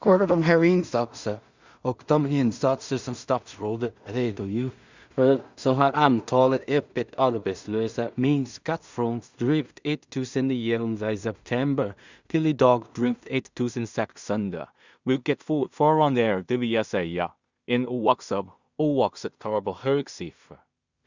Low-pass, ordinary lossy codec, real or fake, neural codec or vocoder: 7.2 kHz; Opus, 64 kbps; fake; codec, 16 kHz in and 24 kHz out, 0.4 kbps, LongCat-Audio-Codec, two codebook decoder